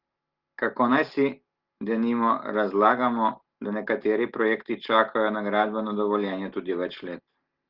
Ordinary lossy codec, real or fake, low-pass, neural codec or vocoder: Opus, 16 kbps; real; 5.4 kHz; none